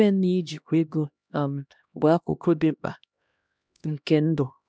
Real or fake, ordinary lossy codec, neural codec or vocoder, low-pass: fake; none; codec, 16 kHz, 1 kbps, X-Codec, HuBERT features, trained on LibriSpeech; none